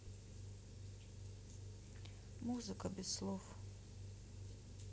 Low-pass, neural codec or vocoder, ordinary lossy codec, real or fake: none; none; none; real